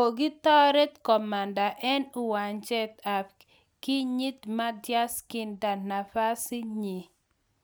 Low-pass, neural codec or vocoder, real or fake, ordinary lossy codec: none; none; real; none